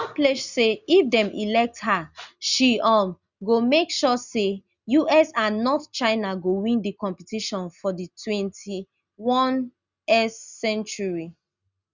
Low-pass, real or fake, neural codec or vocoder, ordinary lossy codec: 7.2 kHz; real; none; Opus, 64 kbps